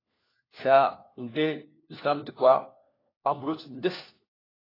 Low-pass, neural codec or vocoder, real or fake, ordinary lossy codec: 5.4 kHz; codec, 16 kHz, 1 kbps, FunCodec, trained on LibriTTS, 50 frames a second; fake; AAC, 24 kbps